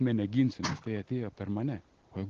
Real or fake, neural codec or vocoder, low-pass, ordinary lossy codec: real; none; 7.2 kHz; Opus, 16 kbps